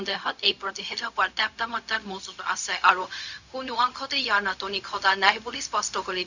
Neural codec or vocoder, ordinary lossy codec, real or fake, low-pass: codec, 16 kHz, 0.4 kbps, LongCat-Audio-Codec; none; fake; 7.2 kHz